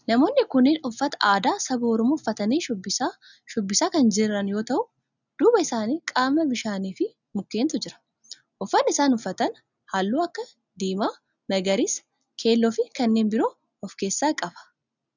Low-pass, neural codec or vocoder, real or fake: 7.2 kHz; none; real